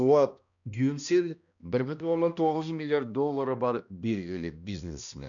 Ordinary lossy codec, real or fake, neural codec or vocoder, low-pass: none; fake; codec, 16 kHz, 1 kbps, X-Codec, HuBERT features, trained on balanced general audio; 7.2 kHz